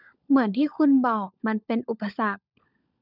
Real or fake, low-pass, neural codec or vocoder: fake; 5.4 kHz; codec, 16 kHz, 16 kbps, FunCodec, trained on LibriTTS, 50 frames a second